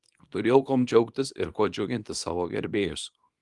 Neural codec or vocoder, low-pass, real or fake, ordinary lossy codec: codec, 24 kHz, 0.9 kbps, WavTokenizer, small release; 10.8 kHz; fake; Opus, 24 kbps